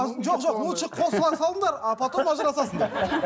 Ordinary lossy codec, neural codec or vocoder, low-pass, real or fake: none; none; none; real